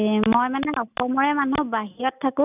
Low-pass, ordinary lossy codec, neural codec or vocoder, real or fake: 3.6 kHz; none; none; real